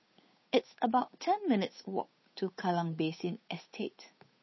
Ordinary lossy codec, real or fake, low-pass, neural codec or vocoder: MP3, 24 kbps; fake; 7.2 kHz; codec, 16 kHz, 8 kbps, FunCodec, trained on Chinese and English, 25 frames a second